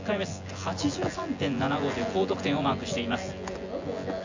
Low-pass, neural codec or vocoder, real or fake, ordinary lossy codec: 7.2 kHz; vocoder, 24 kHz, 100 mel bands, Vocos; fake; AAC, 48 kbps